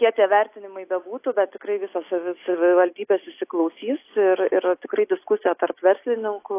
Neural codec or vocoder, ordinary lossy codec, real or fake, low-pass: none; AAC, 24 kbps; real; 3.6 kHz